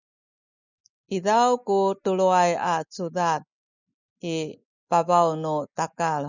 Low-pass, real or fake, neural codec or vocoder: 7.2 kHz; real; none